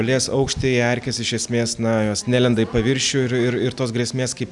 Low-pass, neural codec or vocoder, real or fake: 10.8 kHz; none; real